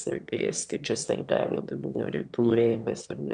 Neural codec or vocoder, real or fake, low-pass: autoencoder, 22.05 kHz, a latent of 192 numbers a frame, VITS, trained on one speaker; fake; 9.9 kHz